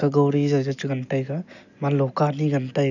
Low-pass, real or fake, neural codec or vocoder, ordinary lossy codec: 7.2 kHz; real; none; none